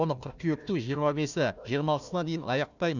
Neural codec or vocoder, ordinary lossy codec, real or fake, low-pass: codec, 16 kHz, 1 kbps, FunCodec, trained on Chinese and English, 50 frames a second; none; fake; 7.2 kHz